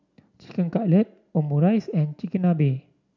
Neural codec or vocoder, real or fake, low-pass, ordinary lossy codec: none; real; 7.2 kHz; none